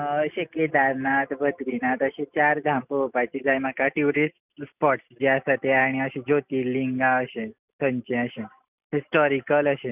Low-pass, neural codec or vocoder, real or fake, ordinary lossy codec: 3.6 kHz; none; real; none